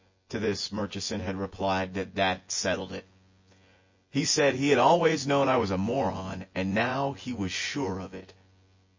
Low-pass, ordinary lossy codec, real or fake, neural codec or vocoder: 7.2 kHz; MP3, 32 kbps; fake; vocoder, 24 kHz, 100 mel bands, Vocos